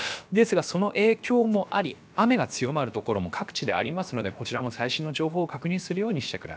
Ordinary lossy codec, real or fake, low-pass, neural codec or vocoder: none; fake; none; codec, 16 kHz, about 1 kbps, DyCAST, with the encoder's durations